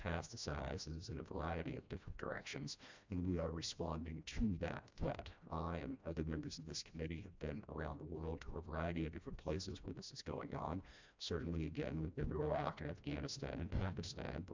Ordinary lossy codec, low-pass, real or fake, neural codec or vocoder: MP3, 64 kbps; 7.2 kHz; fake; codec, 16 kHz, 1 kbps, FreqCodec, smaller model